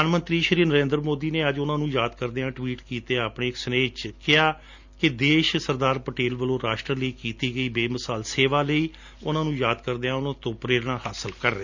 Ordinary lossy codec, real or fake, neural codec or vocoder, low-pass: Opus, 64 kbps; real; none; 7.2 kHz